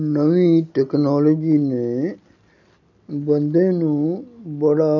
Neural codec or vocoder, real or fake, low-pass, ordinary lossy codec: none; real; 7.2 kHz; none